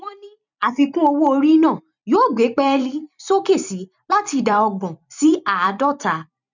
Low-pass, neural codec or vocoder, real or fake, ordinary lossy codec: 7.2 kHz; vocoder, 44.1 kHz, 128 mel bands every 256 samples, BigVGAN v2; fake; none